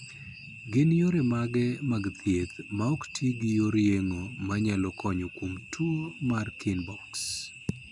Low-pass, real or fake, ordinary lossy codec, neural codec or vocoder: 10.8 kHz; real; none; none